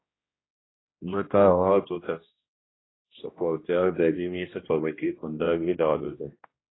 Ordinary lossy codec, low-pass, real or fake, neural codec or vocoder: AAC, 16 kbps; 7.2 kHz; fake; codec, 16 kHz, 1 kbps, X-Codec, HuBERT features, trained on general audio